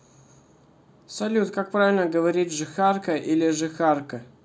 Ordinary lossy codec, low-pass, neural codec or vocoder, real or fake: none; none; none; real